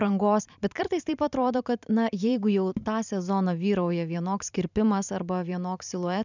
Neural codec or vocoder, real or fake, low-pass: vocoder, 44.1 kHz, 128 mel bands every 512 samples, BigVGAN v2; fake; 7.2 kHz